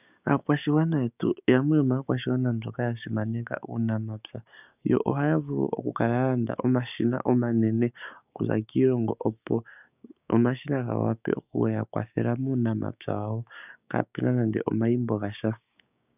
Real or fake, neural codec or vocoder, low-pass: fake; codec, 16 kHz, 6 kbps, DAC; 3.6 kHz